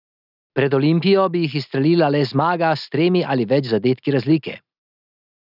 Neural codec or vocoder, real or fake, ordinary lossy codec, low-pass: none; real; none; 5.4 kHz